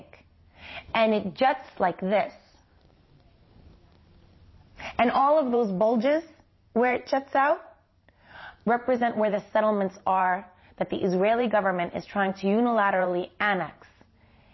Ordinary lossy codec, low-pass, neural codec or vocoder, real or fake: MP3, 24 kbps; 7.2 kHz; vocoder, 44.1 kHz, 128 mel bands every 512 samples, BigVGAN v2; fake